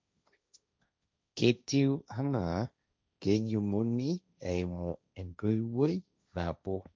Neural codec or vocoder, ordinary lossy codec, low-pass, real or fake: codec, 16 kHz, 1.1 kbps, Voila-Tokenizer; none; none; fake